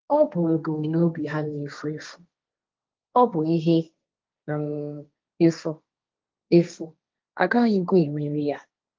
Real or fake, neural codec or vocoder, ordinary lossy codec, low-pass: fake; codec, 16 kHz, 1.1 kbps, Voila-Tokenizer; Opus, 24 kbps; 7.2 kHz